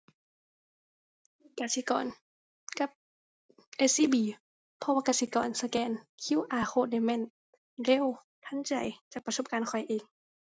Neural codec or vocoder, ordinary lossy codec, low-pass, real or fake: none; none; none; real